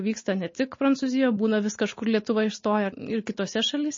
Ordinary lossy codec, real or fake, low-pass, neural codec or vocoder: MP3, 32 kbps; real; 7.2 kHz; none